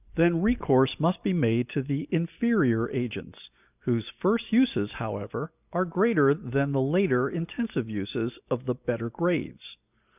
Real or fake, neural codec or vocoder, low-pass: real; none; 3.6 kHz